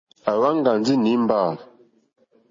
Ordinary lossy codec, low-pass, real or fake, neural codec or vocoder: MP3, 32 kbps; 7.2 kHz; real; none